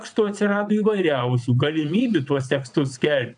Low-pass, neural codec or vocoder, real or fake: 9.9 kHz; vocoder, 22.05 kHz, 80 mel bands, WaveNeXt; fake